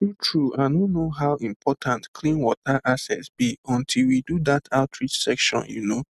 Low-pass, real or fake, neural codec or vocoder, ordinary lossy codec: 14.4 kHz; real; none; none